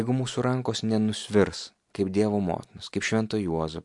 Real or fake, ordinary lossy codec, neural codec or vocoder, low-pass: real; MP3, 64 kbps; none; 10.8 kHz